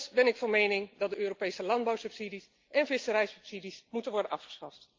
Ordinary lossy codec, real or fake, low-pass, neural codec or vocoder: Opus, 24 kbps; real; 7.2 kHz; none